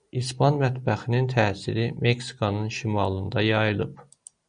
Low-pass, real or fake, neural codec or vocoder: 9.9 kHz; real; none